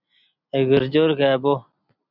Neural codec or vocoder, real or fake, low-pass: none; real; 5.4 kHz